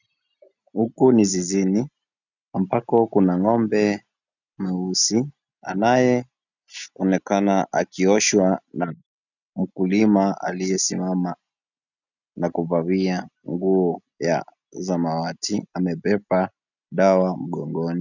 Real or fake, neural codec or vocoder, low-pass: real; none; 7.2 kHz